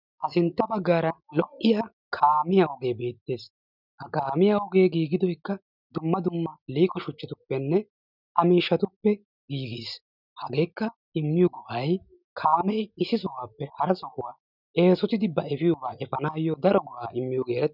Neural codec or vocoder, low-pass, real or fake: none; 5.4 kHz; real